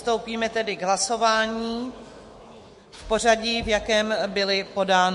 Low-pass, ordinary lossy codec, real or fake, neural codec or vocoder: 14.4 kHz; MP3, 48 kbps; fake; autoencoder, 48 kHz, 128 numbers a frame, DAC-VAE, trained on Japanese speech